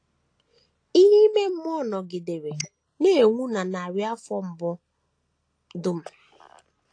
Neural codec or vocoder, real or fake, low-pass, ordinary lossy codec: none; real; 9.9 kHz; AAC, 48 kbps